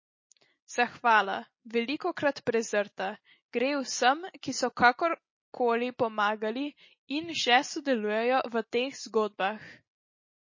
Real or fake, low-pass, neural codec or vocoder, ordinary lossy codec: real; 7.2 kHz; none; MP3, 32 kbps